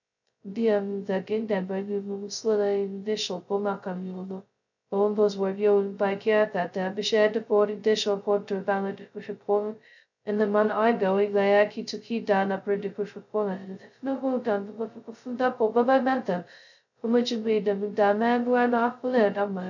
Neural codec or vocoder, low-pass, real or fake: codec, 16 kHz, 0.2 kbps, FocalCodec; 7.2 kHz; fake